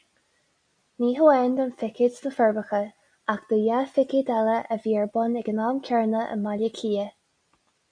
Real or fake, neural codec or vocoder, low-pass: real; none; 9.9 kHz